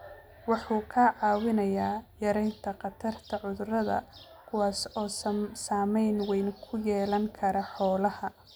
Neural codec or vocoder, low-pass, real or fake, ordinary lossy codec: none; none; real; none